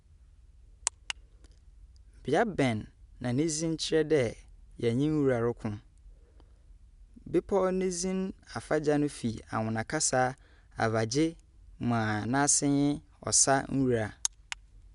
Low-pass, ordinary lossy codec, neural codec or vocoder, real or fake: 10.8 kHz; none; none; real